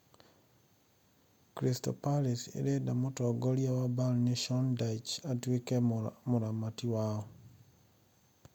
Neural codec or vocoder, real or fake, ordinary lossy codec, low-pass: none; real; MP3, 96 kbps; 19.8 kHz